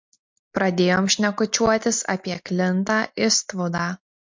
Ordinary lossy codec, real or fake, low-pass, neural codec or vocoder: MP3, 48 kbps; real; 7.2 kHz; none